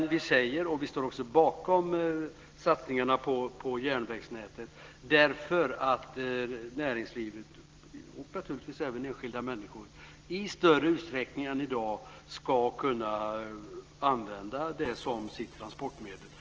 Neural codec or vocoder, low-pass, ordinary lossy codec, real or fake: none; 7.2 kHz; Opus, 16 kbps; real